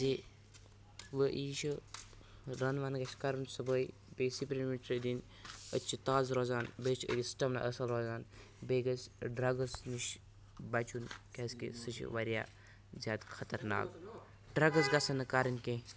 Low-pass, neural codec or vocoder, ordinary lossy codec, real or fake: none; none; none; real